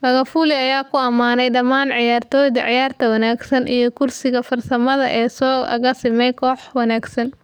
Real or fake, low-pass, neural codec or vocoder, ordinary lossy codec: fake; none; codec, 44.1 kHz, 7.8 kbps, DAC; none